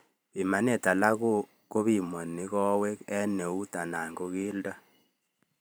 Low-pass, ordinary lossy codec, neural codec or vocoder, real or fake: none; none; vocoder, 44.1 kHz, 128 mel bands every 512 samples, BigVGAN v2; fake